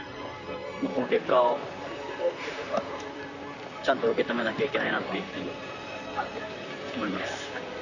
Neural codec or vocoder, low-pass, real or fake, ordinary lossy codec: vocoder, 44.1 kHz, 128 mel bands, Pupu-Vocoder; 7.2 kHz; fake; none